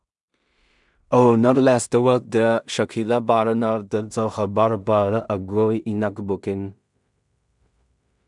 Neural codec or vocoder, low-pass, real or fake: codec, 16 kHz in and 24 kHz out, 0.4 kbps, LongCat-Audio-Codec, two codebook decoder; 10.8 kHz; fake